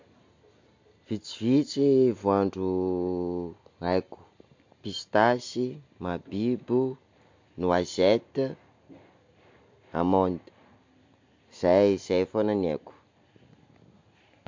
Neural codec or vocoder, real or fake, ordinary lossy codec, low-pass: none; real; MP3, 48 kbps; 7.2 kHz